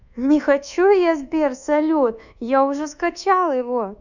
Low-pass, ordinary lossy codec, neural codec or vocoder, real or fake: 7.2 kHz; none; codec, 24 kHz, 1.2 kbps, DualCodec; fake